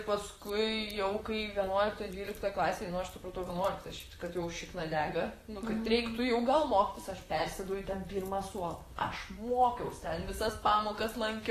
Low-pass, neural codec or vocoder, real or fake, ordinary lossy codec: 14.4 kHz; vocoder, 44.1 kHz, 128 mel bands, Pupu-Vocoder; fake; AAC, 48 kbps